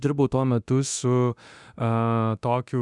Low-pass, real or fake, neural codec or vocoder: 10.8 kHz; fake; codec, 24 kHz, 0.9 kbps, DualCodec